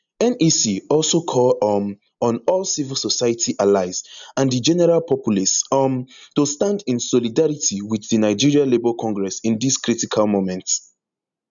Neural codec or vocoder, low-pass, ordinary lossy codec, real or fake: none; 7.2 kHz; none; real